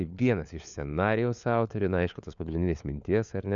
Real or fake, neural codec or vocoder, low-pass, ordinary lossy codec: fake; codec, 16 kHz, 4 kbps, FunCodec, trained on LibriTTS, 50 frames a second; 7.2 kHz; MP3, 96 kbps